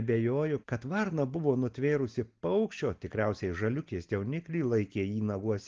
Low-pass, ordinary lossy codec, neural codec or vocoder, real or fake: 7.2 kHz; Opus, 16 kbps; none; real